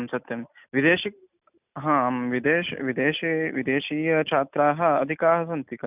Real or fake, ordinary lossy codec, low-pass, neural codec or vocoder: real; none; 3.6 kHz; none